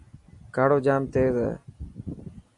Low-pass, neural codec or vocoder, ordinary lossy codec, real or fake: 10.8 kHz; none; AAC, 64 kbps; real